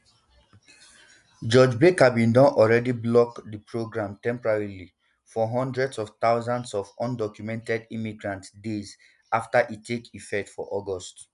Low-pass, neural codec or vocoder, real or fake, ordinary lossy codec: 10.8 kHz; none; real; none